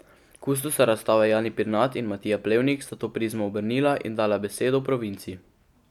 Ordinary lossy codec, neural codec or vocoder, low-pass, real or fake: none; none; 19.8 kHz; real